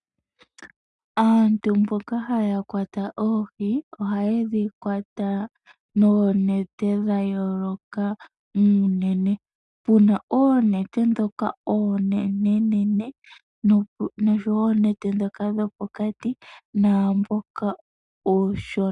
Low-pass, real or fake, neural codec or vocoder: 10.8 kHz; real; none